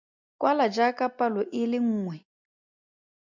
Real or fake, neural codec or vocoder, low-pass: real; none; 7.2 kHz